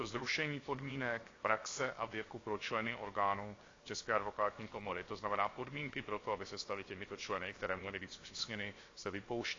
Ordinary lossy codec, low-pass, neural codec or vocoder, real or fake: AAC, 32 kbps; 7.2 kHz; codec, 16 kHz, 0.7 kbps, FocalCodec; fake